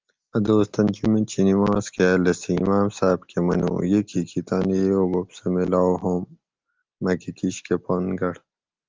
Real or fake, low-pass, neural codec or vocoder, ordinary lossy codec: real; 7.2 kHz; none; Opus, 32 kbps